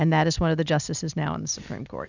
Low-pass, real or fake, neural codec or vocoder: 7.2 kHz; real; none